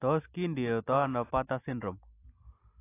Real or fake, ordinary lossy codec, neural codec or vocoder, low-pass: real; AAC, 24 kbps; none; 3.6 kHz